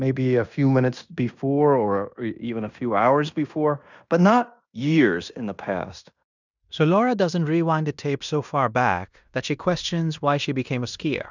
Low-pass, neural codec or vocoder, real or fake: 7.2 kHz; codec, 16 kHz in and 24 kHz out, 0.9 kbps, LongCat-Audio-Codec, fine tuned four codebook decoder; fake